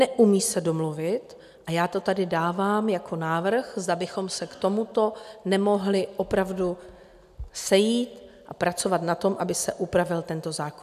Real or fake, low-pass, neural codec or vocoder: real; 14.4 kHz; none